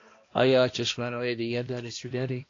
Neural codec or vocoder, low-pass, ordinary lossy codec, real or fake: codec, 16 kHz, 1 kbps, X-Codec, HuBERT features, trained on balanced general audio; 7.2 kHz; AAC, 32 kbps; fake